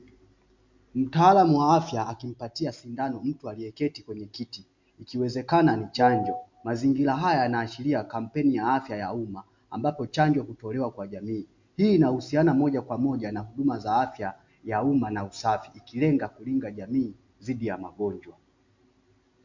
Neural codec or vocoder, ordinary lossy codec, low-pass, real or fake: none; MP3, 64 kbps; 7.2 kHz; real